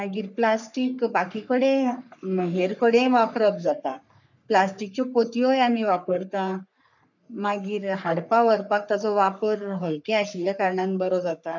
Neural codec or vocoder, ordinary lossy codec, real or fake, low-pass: codec, 44.1 kHz, 3.4 kbps, Pupu-Codec; none; fake; 7.2 kHz